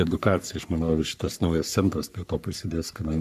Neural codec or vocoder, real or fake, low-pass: codec, 44.1 kHz, 3.4 kbps, Pupu-Codec; fake; 14.4 kHz